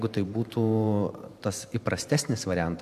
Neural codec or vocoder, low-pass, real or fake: vocoder, 44.1 kHz, 128 mel bands every 512 samples, BigVGAN v2; 14.4 kHz; fake